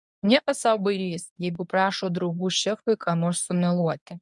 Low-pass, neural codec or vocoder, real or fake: 10.8 kHz; codec, 24 kHz, 0.9 kbps, WavTokenizer, medium speech release version 1; fake